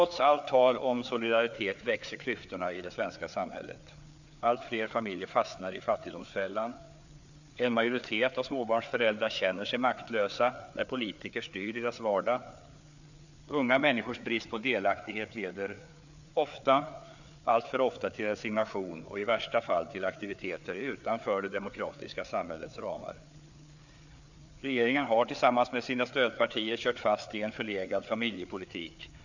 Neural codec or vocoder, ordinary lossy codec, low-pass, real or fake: codec, 16 kHz, 4 kbps, FreqCodec, larger model; none; 7.2 kHz; fake